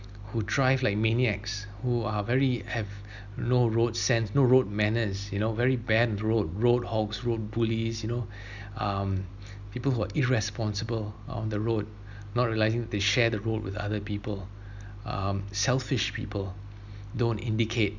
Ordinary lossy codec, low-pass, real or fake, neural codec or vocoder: none; 7.2 kHz; real; none